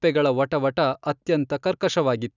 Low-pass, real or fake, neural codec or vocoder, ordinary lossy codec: 7.2 kHz; real; none; none